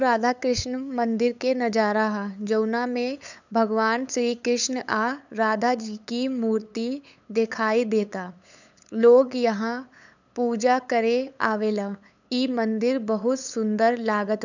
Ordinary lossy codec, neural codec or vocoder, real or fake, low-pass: none; codec, 16 kHz, 8 kbps, FunCodec, trained on LibriTTS, 25 frames a second; fake; 7.2 kHz